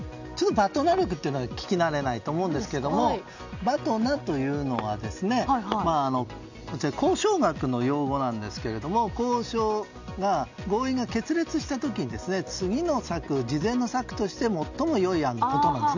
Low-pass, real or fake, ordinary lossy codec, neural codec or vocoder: 7.2 kHz; real; none; none